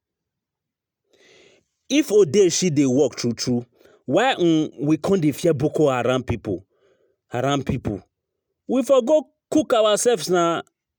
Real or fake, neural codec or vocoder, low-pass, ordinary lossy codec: real; none; none; none